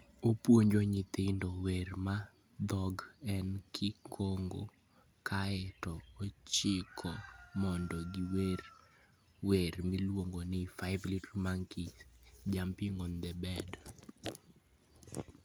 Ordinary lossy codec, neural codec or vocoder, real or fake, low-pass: none; none; real; none